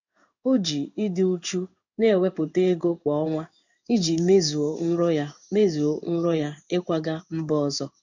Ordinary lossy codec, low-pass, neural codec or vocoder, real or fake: none; 7.2 kHz; codec, 16 kHz in and 24 kHz out, 1 kbps, XY-Tokenizer; fake